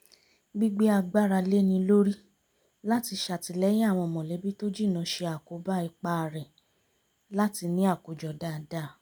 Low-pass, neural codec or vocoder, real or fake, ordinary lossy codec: none; none; real; none